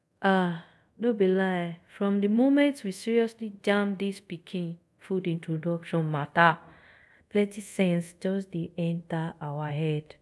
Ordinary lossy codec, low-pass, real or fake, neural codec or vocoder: none; none; fake; codec, 24 kHz, 0.5 kbps, DualCodec